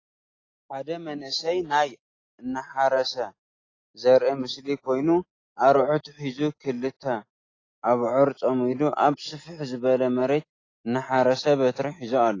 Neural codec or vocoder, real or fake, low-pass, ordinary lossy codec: none; real; 7.2 kHz; AAC, 32 kbps